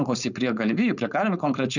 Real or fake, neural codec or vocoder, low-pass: fake; codec, 16 kHz, 4.8 kbps, FACodec; 7.2 kHz